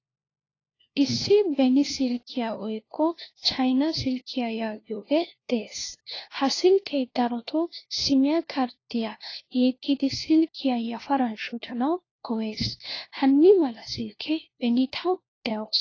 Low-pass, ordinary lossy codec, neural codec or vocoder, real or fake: 7.2 kHz; AAC, 32 kbps; codec, 16 kHz, 1 kbps, FunCodec, trained on LibriTTS, 50 frames a second; fake